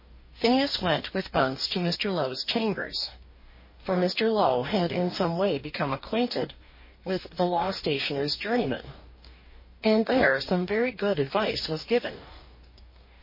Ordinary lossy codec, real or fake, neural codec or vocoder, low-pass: MP3, 24 kbps; fake; codec, 44.1 kHz, 2.6 kbps, DAC; 5.4 kHz